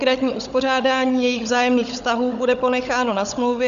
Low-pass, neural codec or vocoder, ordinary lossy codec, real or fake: 7.2 kHz; codec, 16 kHz, 16 kbps, FunCodec, trained on Chinese and English, 50 frames a second; MP3, 96 kbps; fake